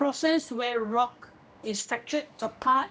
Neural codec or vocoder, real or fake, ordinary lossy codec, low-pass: codec, 16 kHz, 1 kbps, X-Codec, HuBERT features, trained on general audio; fake; none; none